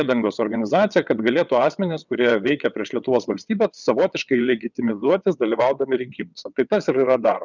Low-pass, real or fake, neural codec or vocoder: 7.2 kHz; fake; vocoder, 22.05 kHz, 80 mel bands, WaveNeXt